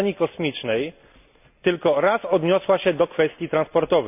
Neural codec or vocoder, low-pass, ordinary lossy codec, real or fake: none; 3.6 kHz; none; real